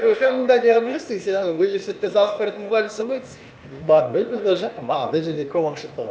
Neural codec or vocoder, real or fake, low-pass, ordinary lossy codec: codec, 16 kHz, 0.8 kbps, ZipCodec; fake; none; none